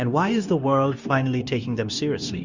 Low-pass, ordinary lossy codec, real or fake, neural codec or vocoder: 7.2 kHz; Opus, 64 kbps; fake; codec, 16 kHz, 0.9 kbps, LongCat-Audio-Codec